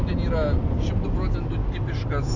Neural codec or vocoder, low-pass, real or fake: none; 7.2 kHz; real